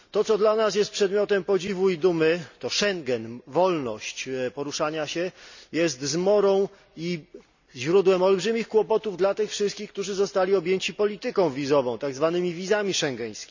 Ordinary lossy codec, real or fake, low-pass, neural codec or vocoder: none; real; 7.2 kHz; none